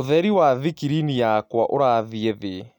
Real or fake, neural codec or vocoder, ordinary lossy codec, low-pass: real; none; none; 19.8 kHz